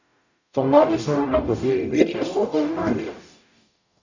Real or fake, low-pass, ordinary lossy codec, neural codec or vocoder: fake; 7.2 kHz; none; codec, 44.1 kHz, 0.9 kbps, DAC